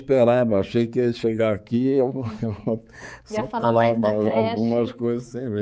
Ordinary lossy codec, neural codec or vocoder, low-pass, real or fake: none; codec, 16 kHz, 4 kbps, X-Codec, HuBERT features, trained on balanced general audio; none; fake